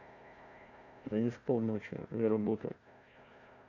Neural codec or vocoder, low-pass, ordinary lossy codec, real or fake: codec, 16 kHz, 1 kbps, FunCodec, trained on Chinese and English, 50 frames a second; 7.2 kHz; none; fake